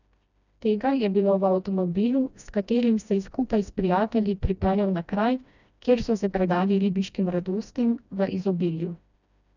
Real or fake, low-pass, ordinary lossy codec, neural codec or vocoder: fake; 7.2 kHz; none; codec, 16 kHz, 1 kbps, FreqCodec, smaller model